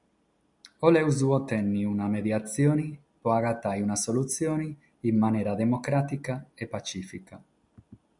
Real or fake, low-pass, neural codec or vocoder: real; 10.8 kHz; none